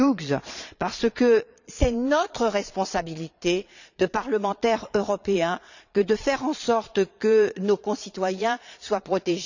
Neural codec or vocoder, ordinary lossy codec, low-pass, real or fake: vocoder, 22.05 kHz, 80 mel bands, Vocos; none; 7.2 kHz; fake